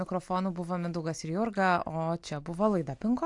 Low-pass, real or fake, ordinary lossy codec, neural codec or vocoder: 10.8 kHz; real; AAC, 64 kbps; none